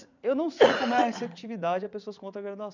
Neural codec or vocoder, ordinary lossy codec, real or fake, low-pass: vocoder, 44.1 kHz, 80 mel bands, Vocos; none; fake; 7.2 kHz